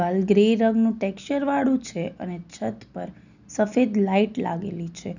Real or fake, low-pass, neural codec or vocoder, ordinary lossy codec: real; 7.2 kHz; none; none